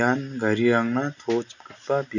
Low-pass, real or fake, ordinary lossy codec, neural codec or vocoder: 7.2 kHz; real; none; none